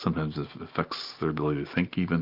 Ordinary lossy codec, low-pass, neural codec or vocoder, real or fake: Opus, 24 kbps; 5.4 kHz; none; real